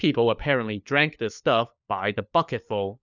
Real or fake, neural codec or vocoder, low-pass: fake; codec, 16 kHz, 4 kbps, FunCodec, trained on LibriTTS, 50 frames a second; 7.2 kHz